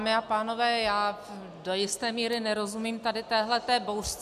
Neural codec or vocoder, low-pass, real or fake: none; 14.4 kHz; real